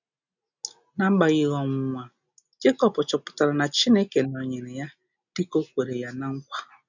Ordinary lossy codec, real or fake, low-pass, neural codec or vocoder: none; real; 7.2 kHz; none